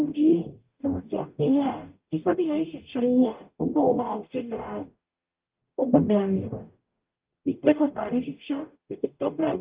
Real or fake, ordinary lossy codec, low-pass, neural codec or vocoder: fake; Opus, 24 kbps; 3.6 kHz; codec, 44.1 kHz, 0.9 kbps, DAC